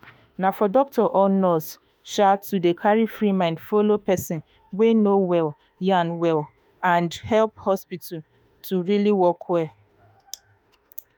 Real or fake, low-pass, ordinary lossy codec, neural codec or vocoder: fake; none; none; autoencoder, 48 kHz, 32 numbers a frame, DAC-VAE, trained on Japanese speech